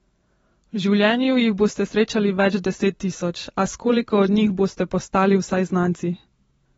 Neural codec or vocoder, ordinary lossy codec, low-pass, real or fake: vocoder, 44.1 kHz, 128 mel bands every 512 samples, BigVGAN v2; AAC, 24 kbps; 19.8 kHz; fake